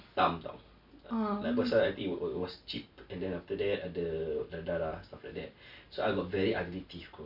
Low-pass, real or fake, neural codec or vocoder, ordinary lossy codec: 5.4 kHz; real; none; MP3, 48 kbps